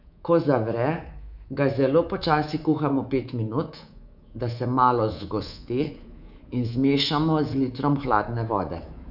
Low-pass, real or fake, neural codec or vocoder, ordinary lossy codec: 5.4 kHz; fake; codec, 24 kHz, 3.1 kbps, DualCodec; none